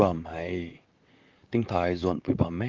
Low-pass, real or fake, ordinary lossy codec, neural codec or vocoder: 7.2 kHz; fake; Opus, 24 kbps; vocoder, 44.1 kHz, 128 mel bands, Pupu-Vocoder